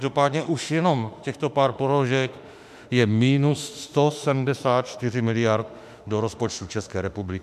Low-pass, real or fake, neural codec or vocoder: 14.4 kHz; fake; autoencoder, 48 kHz, 32 numbers a frame, DAC-VAE, trained on Japanese speech